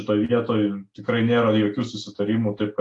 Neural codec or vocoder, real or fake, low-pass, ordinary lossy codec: none; real; 10.8 kHz; AAC, 48 kbps